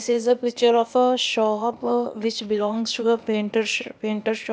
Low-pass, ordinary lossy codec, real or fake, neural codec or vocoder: none; none; fake; codec, 16 kHz, 0.8 kbps, ZipCodec